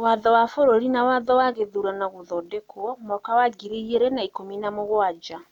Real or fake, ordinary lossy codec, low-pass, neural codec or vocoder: real; none; 19.8 kHz; none